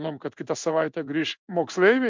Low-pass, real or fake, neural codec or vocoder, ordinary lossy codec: 7.2 kHz; fake; codec, 16 kHz in and 24 kHz out, 1 kbps, XY-Tokenizer; MP3, 64 kbps